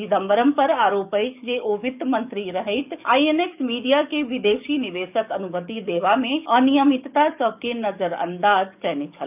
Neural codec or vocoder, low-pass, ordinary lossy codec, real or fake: codec, 44.1 kHz, 7.8 kbps, DAC; 3.6 kHz; none; fake